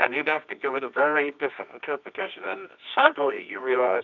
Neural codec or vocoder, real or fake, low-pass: codec, 24 kHz, 0.9 kbps, WavTokenizer, medium music audio release; fake; 7.2 kHz